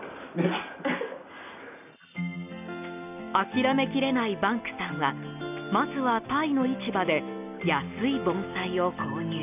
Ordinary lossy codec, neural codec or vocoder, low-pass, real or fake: none; none; 3.6 kHz; real